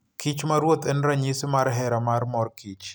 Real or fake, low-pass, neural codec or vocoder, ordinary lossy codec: real; none; none; none